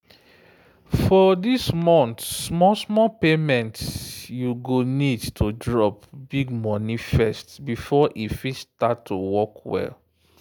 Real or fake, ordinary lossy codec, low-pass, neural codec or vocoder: real; none; none; none